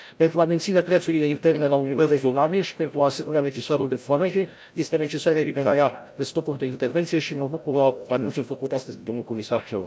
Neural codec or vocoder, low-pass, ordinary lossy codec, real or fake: codec, 16 kHz, 0.5 kbps, FreqCodec, larger model; none; none; fake